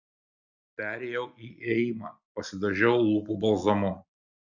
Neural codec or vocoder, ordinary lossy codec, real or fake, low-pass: none; Opus, 64 kbps; real; 7.2 kHz